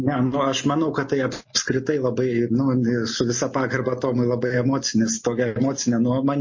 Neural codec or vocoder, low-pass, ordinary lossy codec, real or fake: none; 7.2 kHz; MP3, 32 kbps; real